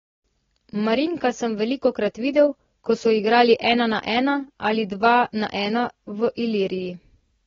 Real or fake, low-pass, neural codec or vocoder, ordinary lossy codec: real; 7.2 kHz; none; AAC, 24 kbps